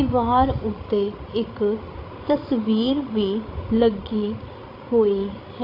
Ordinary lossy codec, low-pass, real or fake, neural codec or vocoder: AAC, 32 kbps; 5.4 kHz; fake; codec, 16 kHz, 16 kbps, FreqCodec, larger model